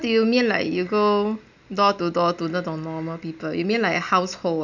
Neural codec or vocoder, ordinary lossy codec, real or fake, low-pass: none; none; real; 7.2 kHz